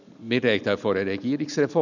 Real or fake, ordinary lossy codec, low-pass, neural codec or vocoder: real; none; 7.2 kHz; none